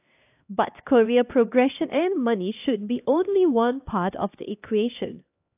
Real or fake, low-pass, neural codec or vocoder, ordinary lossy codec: fake; 3.6 kHz; codec, 16 kHz, 1 kbps, X-Codec, HuBERT features, trained on LibriSpeech; AAC, 32 kbps